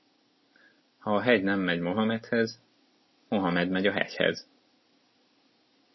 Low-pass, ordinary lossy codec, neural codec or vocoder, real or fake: 7.2 kHz; MP3, 24 kbps; none; real